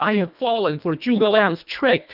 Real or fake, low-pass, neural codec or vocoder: fake; 5.4 kHz; codec, 24 kHz, 1.5 kbps, HILCodec